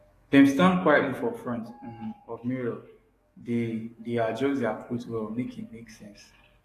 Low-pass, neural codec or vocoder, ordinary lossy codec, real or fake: 14.4 kHz; codec, 44.1 kHz, 7.8 kbps, DAC; AAC, 48 kbps; fake